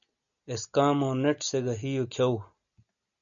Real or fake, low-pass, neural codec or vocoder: real; 7.2 kHz; none